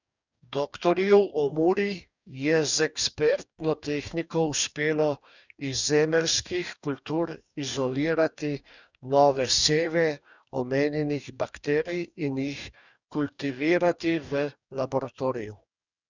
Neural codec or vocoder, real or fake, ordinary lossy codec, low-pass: codec, 44.1 kHz, 2.6 kbps, DAC; fake; none; 7.2 kHz